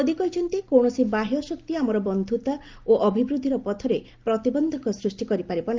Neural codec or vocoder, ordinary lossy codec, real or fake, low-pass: none; Opus, 32 kbps; real; 7.2 kHz